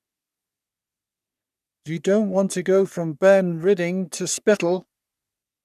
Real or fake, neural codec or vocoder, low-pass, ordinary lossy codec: fake; codec, 44.1 kHz, 3.4 kbps, Pupu-Codec; 14.4 kHz; none